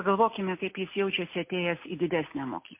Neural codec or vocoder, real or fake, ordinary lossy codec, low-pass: none; real; MP3, 24 kbps; 3.6 kHz